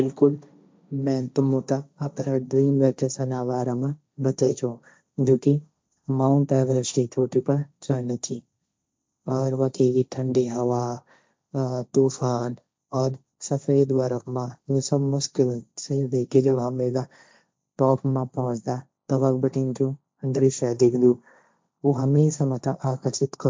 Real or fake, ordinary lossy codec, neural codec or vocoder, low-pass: fake; none; codec, 16 kHz, 1.1 kbps, Voila-Tokenizer; none